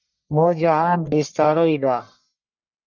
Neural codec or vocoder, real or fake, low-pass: codec, 44.1 kHz, 1.7 kbps, Pupu-Codec; fake; 7.2 kHz